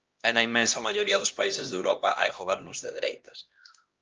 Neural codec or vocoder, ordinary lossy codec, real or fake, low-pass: codec, 16 kHz, 1 kbps, X-Codec, HuBERT features, trained on LibriSpeech; Opus, 24 kbps; fake; 7.2 kHz